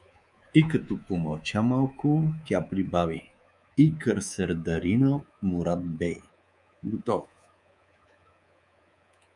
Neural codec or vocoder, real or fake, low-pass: codec, 24 kHz, 3.1 kbps, DualCodec; fake; 10.8 kHz